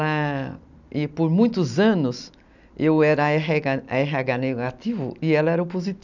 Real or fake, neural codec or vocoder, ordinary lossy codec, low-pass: real; none; none; 7.2 kHz